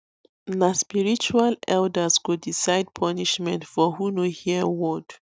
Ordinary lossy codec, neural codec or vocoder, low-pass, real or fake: none; none; none; real